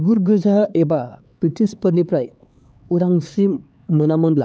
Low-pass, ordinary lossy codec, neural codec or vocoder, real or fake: none; none; codec, 16 kHz, 4 kbps, X-Codec, HuBERT features, trained on LibriSpeech; fake